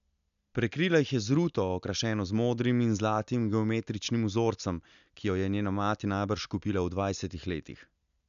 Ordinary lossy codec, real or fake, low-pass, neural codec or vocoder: none; real; 7.2 kHz; none